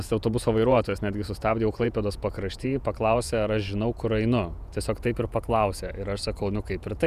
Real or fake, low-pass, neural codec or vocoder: fake; 14.4 kHz; vocoder, 48 kHz, 128 mel bands, Vocos